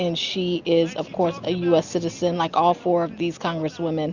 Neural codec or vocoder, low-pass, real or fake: none; 7.2 kHz; real